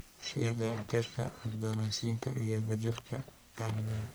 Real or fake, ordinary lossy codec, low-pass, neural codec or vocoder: fake; none; none; codec, 44.1 kHz, 1.7 kbps, Pupu-Codec